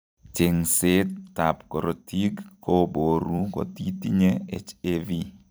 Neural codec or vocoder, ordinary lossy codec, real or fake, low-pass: vocoder, 44.1 kHz, 128 mel bands every 256 samples, BigVGAN v2; none; fake; none